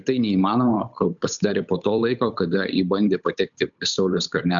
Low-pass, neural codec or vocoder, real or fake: 7.2 kHz; codec, 16 kHz, 8 kbps, FunCodec, trained on Chinese and English, 25 frames a second; fake